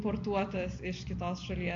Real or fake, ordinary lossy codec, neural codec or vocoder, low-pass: real; MP3, 48 kbps; none; 7.2 kHz